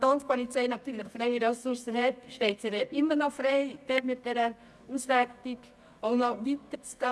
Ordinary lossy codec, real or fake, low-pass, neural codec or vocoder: none; fake; none; codec, 24 kHz, 0.9 kbps, WavTokenizer, medium music audio release